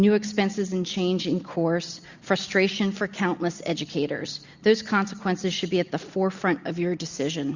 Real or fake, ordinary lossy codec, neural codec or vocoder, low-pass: real; Opus, 64 kbps; none; 7.2 kHz